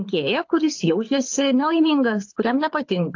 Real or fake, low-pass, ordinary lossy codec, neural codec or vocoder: fake; 7.2 kHz; AAC, 48 kbps; codec, 24 kHz, 6 kbps, HILCodec